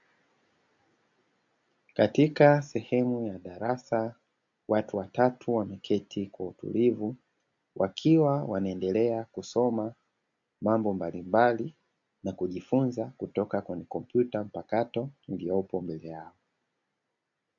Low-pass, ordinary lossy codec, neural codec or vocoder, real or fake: 7.2 kHz; AAC, 64 kbps; none; real